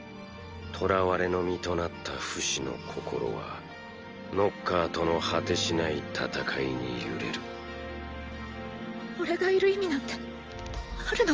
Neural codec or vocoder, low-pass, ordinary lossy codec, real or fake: none; 7.2 kHz; Opus, 24 kbps; real